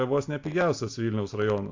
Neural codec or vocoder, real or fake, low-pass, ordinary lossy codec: none; real; 7.2 kHz; AAC, 48 kbps